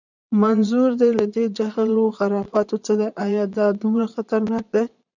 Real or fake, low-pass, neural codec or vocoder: fake; 7.2 kHz; vocoder, 22.05 kHz, 80 mel bands, Vocos